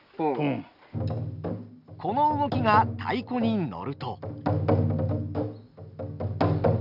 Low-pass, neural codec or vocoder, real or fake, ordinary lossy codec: 5.4 kHz; none; real; none